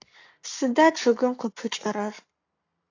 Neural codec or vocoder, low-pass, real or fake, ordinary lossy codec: codec, 44.1 kHz, 7.8 kbps, Pupu-Codec; 7.2 kHz; fake; AAC, 48 kbps